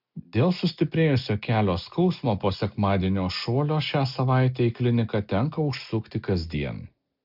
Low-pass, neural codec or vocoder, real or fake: 5.4 kHz; none; real